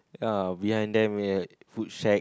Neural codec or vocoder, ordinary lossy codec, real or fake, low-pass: none; none; real; none